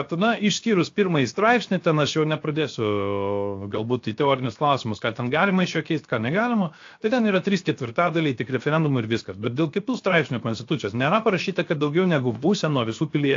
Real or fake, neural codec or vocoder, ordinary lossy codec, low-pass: fake; codec, 16 kHz, 0.7 kbps, FocalCodec; AAC, 48 kbps; 7.2 kHz